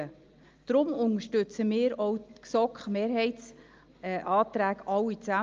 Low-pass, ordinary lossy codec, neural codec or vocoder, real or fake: 7.2 kHz; Opus, 24 kbps; none; real